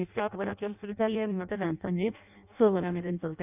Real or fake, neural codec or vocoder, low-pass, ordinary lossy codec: fake; codec, 16 kHz in and 24 kHz out, 0.6 kbps, FireRedTTS-2 codec; 3.6 kHz; none